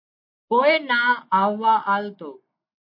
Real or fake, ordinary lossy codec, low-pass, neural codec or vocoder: real; MP3, 32 kbps; 5.4 kHz; none